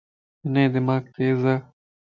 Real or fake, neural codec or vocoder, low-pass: real; none; 7.2 kHz